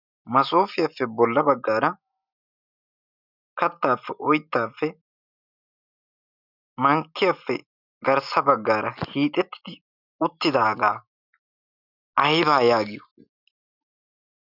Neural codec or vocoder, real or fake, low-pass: none; real; 5.4 kHz